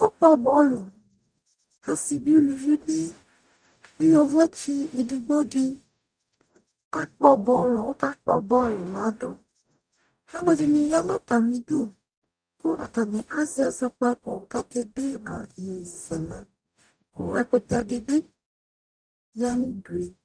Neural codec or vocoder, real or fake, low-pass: codec, 44.1 kHz, 0.9 kbps, DAC; fake; 9.9 kHz